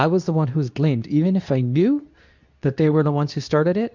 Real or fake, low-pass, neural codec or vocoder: fake; 7.2 kHz; codec, 24 kHz, 0.9 kbps, WavTokenizer, medium speech release version 2